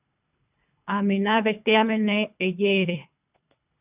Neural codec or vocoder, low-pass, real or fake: codec, 24 kHz, 3 kbps, HILCodec; 3.6 kHz; fake